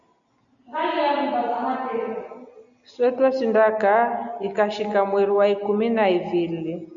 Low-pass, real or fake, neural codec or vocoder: 7.2 kHz; real; none